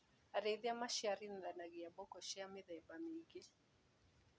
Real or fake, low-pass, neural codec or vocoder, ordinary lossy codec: real; none; none; none